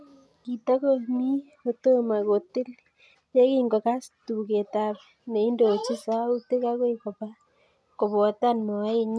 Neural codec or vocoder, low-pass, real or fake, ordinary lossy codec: none; none; real; none